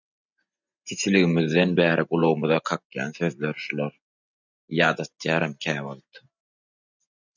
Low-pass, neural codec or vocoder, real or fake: 7.2 kHz; none; real